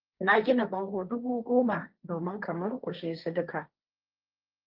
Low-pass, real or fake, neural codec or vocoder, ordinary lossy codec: 5.4 kHz; fake; codec, 16 kHz, 1.1 kbps, Voila-Tokenizer; Opus, 24 kbps